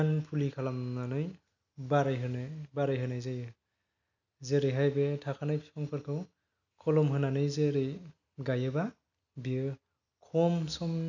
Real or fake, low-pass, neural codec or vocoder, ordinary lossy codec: real; 7.2 kHz; none; none